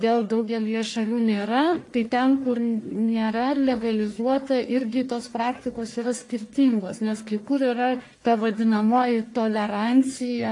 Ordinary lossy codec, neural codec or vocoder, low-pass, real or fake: AAC, 48 kbps; codec, 44.1 kHz, 1.7 kbps, Pupu-Codec; 10.8 kHz; fake